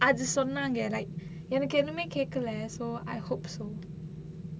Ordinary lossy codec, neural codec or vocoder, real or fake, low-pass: none; none; real; none